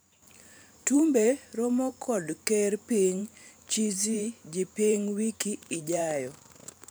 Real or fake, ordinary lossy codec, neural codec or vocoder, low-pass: fake; none; vocoder, 44.1 kHz, 128 mel bands every 512 samples, BigVGAN v2; none